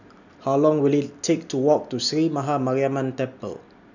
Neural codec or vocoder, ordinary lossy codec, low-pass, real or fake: none; none; 7.2 kHz; real